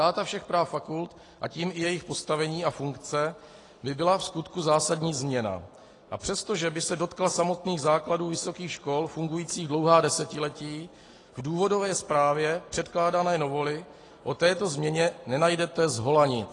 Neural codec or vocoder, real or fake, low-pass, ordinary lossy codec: none; real; 10.8 kHz; AAC, 32 kbps